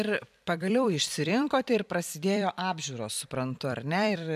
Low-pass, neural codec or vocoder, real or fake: 14.4 kHz; vocoder, 44.1 kHz, 128 mel bands every 256 samples, BigVGAN v2; fake